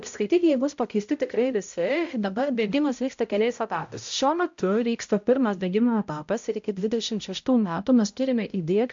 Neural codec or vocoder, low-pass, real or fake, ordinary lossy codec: codec, 16 kHz, 0.5 kbps, X-Codec, HuBERT features, trained on balanced general audio; 7.2 kHz; fake; AAC, 64 kbps